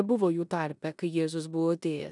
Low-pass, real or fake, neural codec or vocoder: 10.8 kHz; fake; codec, 16 kHz in and 24 kHz out, 0.9 kbps, LongCat-Audio-Codec, four codebook decoder